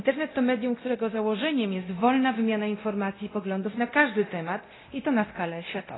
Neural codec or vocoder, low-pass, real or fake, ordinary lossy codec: codec, 24 kHz, 0.9 kbps, DualCodec; 7.2 kHz; fake; AAC, 16 kbps